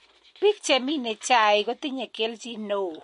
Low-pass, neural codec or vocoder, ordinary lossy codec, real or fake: 14.4 kHz; none; MP3, 48 kbps; real